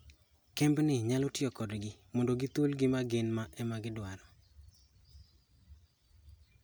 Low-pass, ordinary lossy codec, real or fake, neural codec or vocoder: none; none; real; none